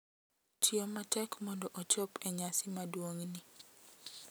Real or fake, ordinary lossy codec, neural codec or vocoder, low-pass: real; none; none; none